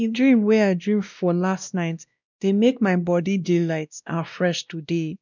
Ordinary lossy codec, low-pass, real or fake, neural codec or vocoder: none; 7.2 kHz; fake; codec, 16 kHz, 1 kbps, X-Codec, WavLM features, trained on Multilingual LibriSpeech